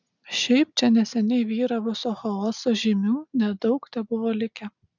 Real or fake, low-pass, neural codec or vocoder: real; 7.2 kHz; none